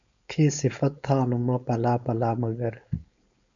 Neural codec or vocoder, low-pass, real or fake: codec, 16 kHz, 4.8 kbps, FACodec; 7.2 kHz; fake